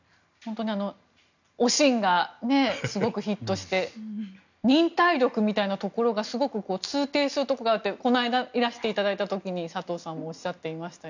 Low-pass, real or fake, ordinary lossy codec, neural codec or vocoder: 7.2 kHz; real; none; none